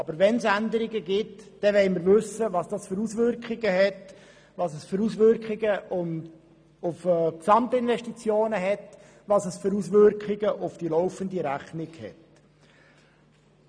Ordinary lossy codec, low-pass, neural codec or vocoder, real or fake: none; 9.9 kHz; none; real